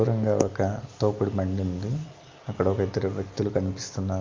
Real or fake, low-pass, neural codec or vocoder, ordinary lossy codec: real; 7.2 kHz; none; Opus, 24 kbps